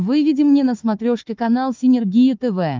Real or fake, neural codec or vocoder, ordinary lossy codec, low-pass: fake; codec, 16 kHz, 4 kbps, FunCodec, trained on Chinese and English, 50 frames a second; Opus, 32 kbps; 7.2 kHz